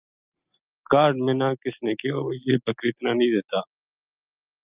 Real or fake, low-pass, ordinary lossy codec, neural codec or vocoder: real; 3.6 kHz; Opus, 24 kbps; none